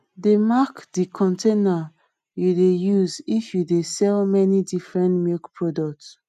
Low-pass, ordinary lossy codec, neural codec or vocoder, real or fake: 14.4 kHz; none; none; real